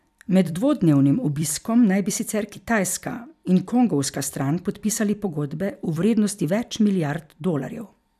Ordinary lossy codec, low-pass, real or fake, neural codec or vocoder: none; 14.4 kHz; fake; vocoder, 44.1 kHz, 128 mel bands every 256 samples, BigVGAN v2